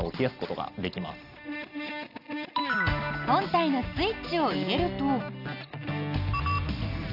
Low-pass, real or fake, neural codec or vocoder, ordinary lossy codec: 5.4 kHz; real; none; none